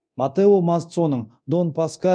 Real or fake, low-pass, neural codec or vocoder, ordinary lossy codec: fake; 9.9 kHz; codec, 24 kHz, 0.9 kbps, DualCodec; none